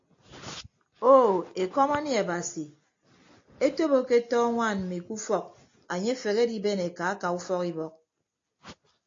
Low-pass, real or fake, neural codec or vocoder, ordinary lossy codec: 7.2 kHz; real; none; AAC, 48 kbps